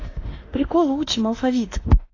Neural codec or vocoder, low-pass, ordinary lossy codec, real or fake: autoencoder, 48 kHz, 32 numbers a frame, DAC-VAE, trained on Japanese speech; 7.2 kHz; AAC, 32 kbps; fake